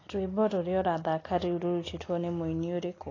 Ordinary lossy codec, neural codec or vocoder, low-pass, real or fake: AAC, 32 kbps; none; 7.2 kHz; real